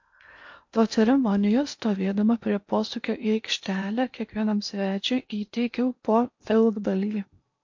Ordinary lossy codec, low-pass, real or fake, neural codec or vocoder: MP3, 48 kbps; 7.2 kHz; fake; codec, 16 kHz in and 24 kHz out, 0.8 kbps, FocalCodec, streaming, 65536 codes